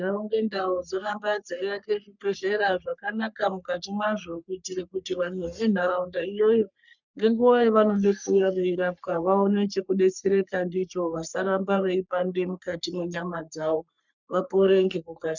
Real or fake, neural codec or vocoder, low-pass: fake; codec, 44.1 kHz, 3.4 kbps, Pupu-Codec; 7.2 kHz